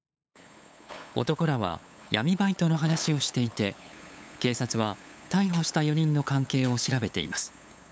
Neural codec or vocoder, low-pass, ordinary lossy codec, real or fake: codec, 16 kHz, 8 kbps, FunCodec, trained on LibriTTS, 25 frames a second; none; none; fake